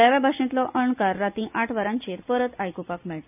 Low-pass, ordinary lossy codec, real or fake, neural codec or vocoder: 3.6 kHz; none; real; none